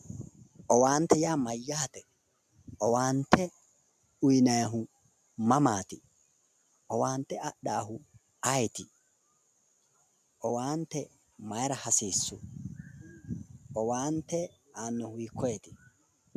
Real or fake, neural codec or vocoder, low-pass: real; none; 14.4 kHz